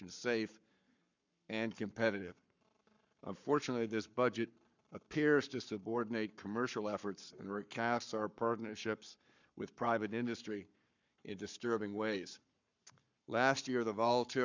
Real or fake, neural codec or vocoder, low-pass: fake; codec, 44.1 kHz, 7.8 kbps, Pupu-Codec; 7.2 kHz